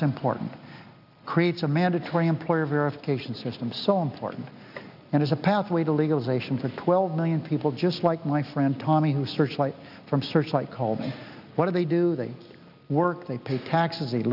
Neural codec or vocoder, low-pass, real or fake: none; 5.4 kHz; real